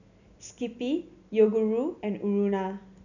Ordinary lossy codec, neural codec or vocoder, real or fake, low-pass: none; none; real; 7.2 kHz